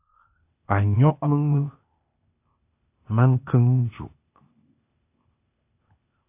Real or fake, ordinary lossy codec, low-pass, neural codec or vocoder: fake; AAC, 24 kbps; 3.6 kHz; codec, 16 kHz, 0.8 kbps, ZipCodec